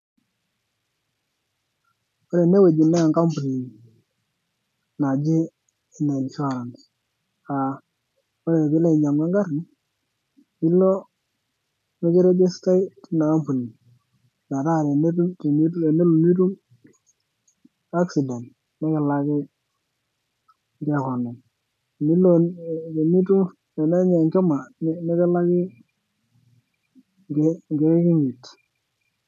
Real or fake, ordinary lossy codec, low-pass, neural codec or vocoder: real; MP3, 96 kbps; 14.4 kHz; none